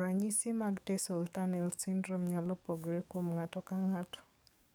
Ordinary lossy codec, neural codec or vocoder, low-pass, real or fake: none; codec, 44.1 kHz, 7.8 kbps, DAC; none; fake